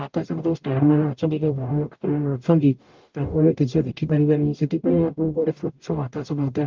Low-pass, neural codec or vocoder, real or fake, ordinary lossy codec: 7.2 kHz; codec, 44.1 kHz, 0.9 kbps, DAC; fake; Opus, 32 kbps